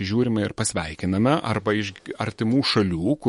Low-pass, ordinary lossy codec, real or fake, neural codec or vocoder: 19.8 kHz; MP3, 48 kbps; fake; autoencoder, 48 kHz, 128 numbers a frame, DAC-VAE, trained on Japanese speech